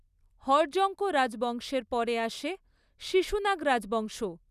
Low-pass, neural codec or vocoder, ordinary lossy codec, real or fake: 14.4 kHz; none; none; real